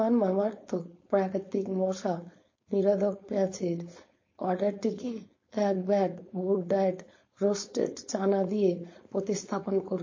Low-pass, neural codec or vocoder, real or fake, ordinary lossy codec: 7.2 kHz; codec, 16 kHz, 4.8 kbps, FACodec; fake; MP3, 32 kbps